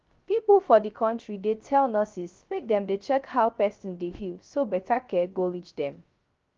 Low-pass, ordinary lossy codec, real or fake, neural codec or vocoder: 7.2 kHz; Opus, 32 kbps; fake; codec, 16 kHz, 0.3 kbps, FocalCodec